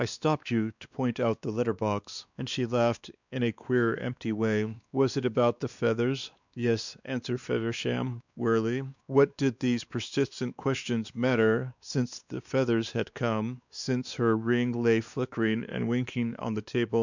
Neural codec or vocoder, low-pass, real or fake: codec, 16 kHz, 2 kbps, X-Codec, WavLM features, trained on Multilingual LibriSpeech; 7.2 kHz; fake